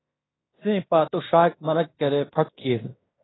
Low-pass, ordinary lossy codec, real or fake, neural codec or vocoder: 7.2 kHz; AAC, 16 kbps; fake; codec, 16 kHz in and 24 kHz out, 0.9 kbps, LongCat-Audio-Codec, fine tuned four codebook decoder